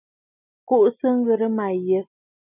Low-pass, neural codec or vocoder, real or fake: 3.6 kHz; none; real